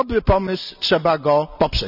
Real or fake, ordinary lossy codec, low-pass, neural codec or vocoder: real; none; 5.4 kHz; none